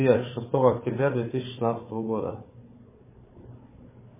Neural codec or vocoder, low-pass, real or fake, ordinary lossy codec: codec, 16 kHz, 4 kbps, FunCodec, trained on Chinese and English, 50 frames a second; 3.6 kHz; fake; MP3, 16 kbps